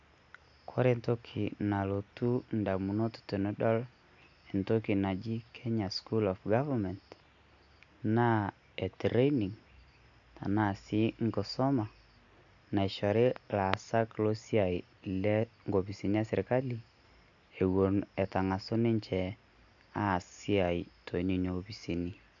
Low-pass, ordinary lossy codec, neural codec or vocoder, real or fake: 7.2 kHz; none; none; real